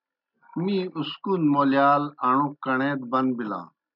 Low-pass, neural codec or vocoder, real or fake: 5.4 kHz; none; real